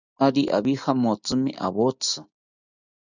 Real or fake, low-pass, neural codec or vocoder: real; 7.2 kHz; none